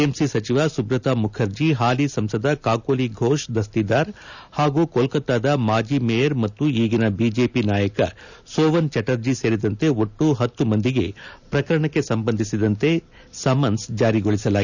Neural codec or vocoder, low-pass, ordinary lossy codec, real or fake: none; 7.2 kHz; none; real